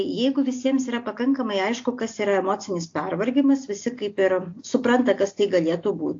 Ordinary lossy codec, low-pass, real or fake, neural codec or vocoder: AAC, 48 kbps; 7.2 kHz; real; none